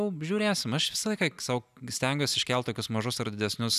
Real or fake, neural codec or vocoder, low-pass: real; none; 14.4 kHz